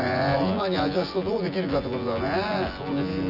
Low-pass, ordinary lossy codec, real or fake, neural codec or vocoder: 5.4 kHz; Opus, 64 kbps; fake; vocoder, 24 kHz, 100 mel bands, Vocos